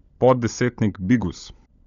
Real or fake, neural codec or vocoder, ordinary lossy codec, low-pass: fake; codec, 16 kHz, 16 kbps, FunCodec, trained on LibriTTS, 50 frames a second; none; 7.2 kHz